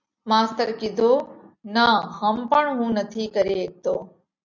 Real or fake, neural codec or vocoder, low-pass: real; none; 7.2 kHz